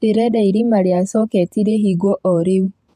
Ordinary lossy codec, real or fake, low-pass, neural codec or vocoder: AAC, 96 kbps; fake; 14.4 kHz; vocoder, 48 kHz, 128 mel bands, Vocos